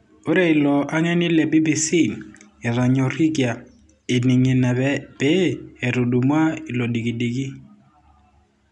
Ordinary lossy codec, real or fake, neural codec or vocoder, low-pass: none; real; none; 10.8 kHz